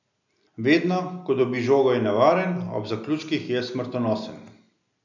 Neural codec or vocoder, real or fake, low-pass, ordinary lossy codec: none; real; 7.2 kHz; none